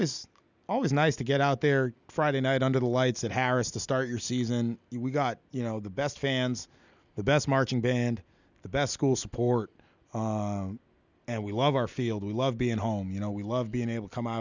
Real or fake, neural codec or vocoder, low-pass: real; none; 7.2 kHz